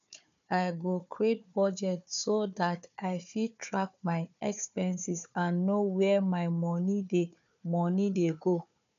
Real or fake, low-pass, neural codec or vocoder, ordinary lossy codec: fake; 7.2 kHz; codec, 16 kHz, 4 kbps, FunCodec, trained on Chinese and English, 50 frames a second; none